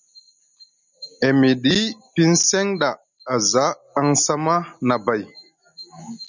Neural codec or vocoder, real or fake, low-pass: none; real; 7.2 kHz